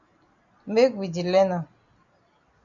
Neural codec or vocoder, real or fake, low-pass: none; real; 7.2 kHz